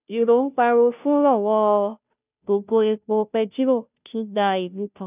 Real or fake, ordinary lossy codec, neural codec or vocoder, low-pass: fake; none; codec, 16 kHz, 0.5 kbps, FunCodec, trained on Chinese and English, 25 frames a second; 3.6 kHz